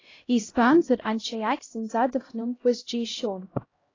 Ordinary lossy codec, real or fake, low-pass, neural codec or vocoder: AAC, 32 kbps; fake; 7.2 kHz; codec, 16 kHz, 0.5 kbps, X-Codec, HuBERT features, trained on LibriSpeech